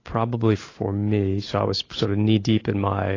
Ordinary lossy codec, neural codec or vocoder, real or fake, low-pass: AAC, 32 kbps; none; real; 7.2 kHz